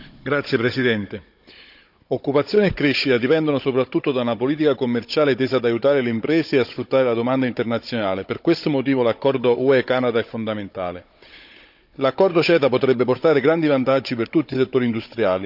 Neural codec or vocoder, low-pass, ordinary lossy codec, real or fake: codec, 16 kHz, 16 kbps, FunCodec, trained on Chinese and English, 50 frames a second; 5.4 kHz; none; fake